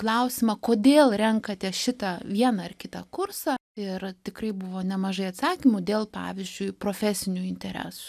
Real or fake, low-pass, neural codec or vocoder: real; 14.4 kHz; none